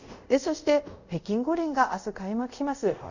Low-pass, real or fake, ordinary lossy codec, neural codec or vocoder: 7.2 kHz; fake; none; codec, 24 kHz, 0.5 kbps, DualCodec